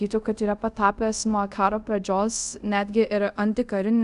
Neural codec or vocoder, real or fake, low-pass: codec, 24 kHz, 0.5 kbps, DualCodec; fake; 10.8 kHz